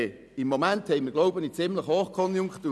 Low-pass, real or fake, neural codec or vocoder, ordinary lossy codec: none; real; none; none